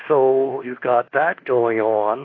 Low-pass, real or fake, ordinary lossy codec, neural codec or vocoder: 7.2 kHz; fake; AAC, 32 kbps; codec, 24 kHz, 1.2 kbps, DualCodec